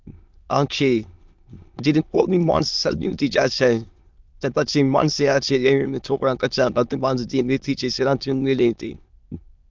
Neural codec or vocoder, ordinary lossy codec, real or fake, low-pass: autoencoder, 22.05 kHz, a latent of 192 numbers a frame, VITS, trained on many speakers; Opus, 24 kbps; fake; 7.2 kHz